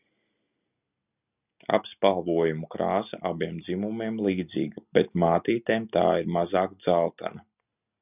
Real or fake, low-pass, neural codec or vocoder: real; 3.6 kHz; none